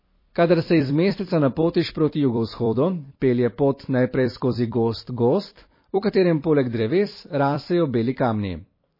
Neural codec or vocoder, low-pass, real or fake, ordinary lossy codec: vocoder, 44.1 kHz, 128 mel bands every 256 samples, BigVGAN v2; 5.4 kHz; fake; MP3, 24 kbps